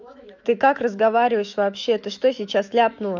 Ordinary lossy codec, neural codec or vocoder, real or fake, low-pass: none; autoencoder, 48 kHz, 128 numbers a frame, DAC-VAE, trained on Japanese speech; fake; 7.2 kHz